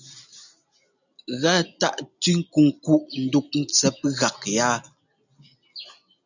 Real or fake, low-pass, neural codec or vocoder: real; 7.2 kHz; none